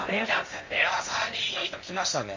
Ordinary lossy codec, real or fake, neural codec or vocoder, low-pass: MP3, 32 kbps; fake; codec, 16 kHz in and 24 kHz out, 0.6 kbps, FocalCodec, streaming, 2048 codes; 7.2 kHz